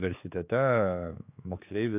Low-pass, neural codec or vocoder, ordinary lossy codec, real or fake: 3.6 kHz; codec, 16 kHz, 4 kbps, X-Codec, HuBERT features, trained on general audio; AAC, 32 kbps; fake